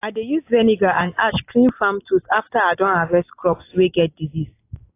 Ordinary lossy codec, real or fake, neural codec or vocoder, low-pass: AAC, 24 kbps; real; none; 3.6 kHz